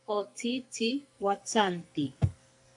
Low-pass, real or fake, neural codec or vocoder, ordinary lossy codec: 10.8 kHz; fake; codec, 44.1 kHz, 2.6 kbps, SNAC; AAC, 64 kbps